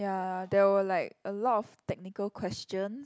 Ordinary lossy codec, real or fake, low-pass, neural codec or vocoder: none; real; none; none